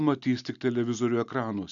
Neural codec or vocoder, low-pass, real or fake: none; 7.2 kHz; real